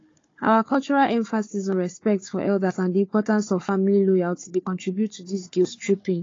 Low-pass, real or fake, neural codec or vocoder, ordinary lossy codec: 7.2 kHz; fake; codec, 16 kHz, 4 kbps, FunCodec, trained on Chinese and English, 50 frames a second; AAC, 32 kbps